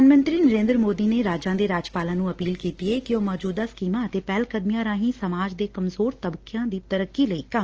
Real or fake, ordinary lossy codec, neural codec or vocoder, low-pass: real; Opus, 16 kbps; none; 7.2 kHz